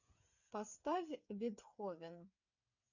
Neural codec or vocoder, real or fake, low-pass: codec, 16 kHz, 8 kbps, FreqCodec, smaller model; fake; 7.2 kHz